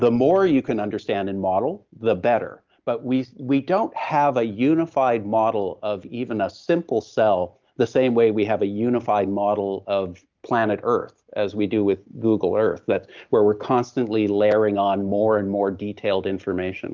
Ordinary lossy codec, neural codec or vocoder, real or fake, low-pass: Opus, 24 kbps; none; real; 7.2 kHz